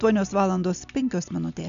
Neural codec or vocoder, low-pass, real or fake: none; 7.2 kHz; real